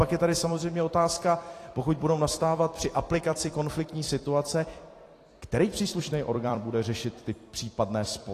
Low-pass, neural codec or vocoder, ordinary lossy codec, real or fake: 14.4 kHz; vocoder, 44.1 kHz, 128 mel bands every 256 samples, BigVGAN v2; AAC, 48 kbps; fake